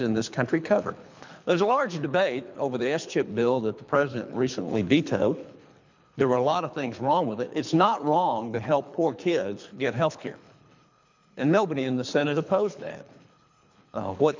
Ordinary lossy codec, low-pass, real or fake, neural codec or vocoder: MP3, 64 kbps; 7.2 kHz; fake; codec, 24 kHz, 3 kbps, HILCodec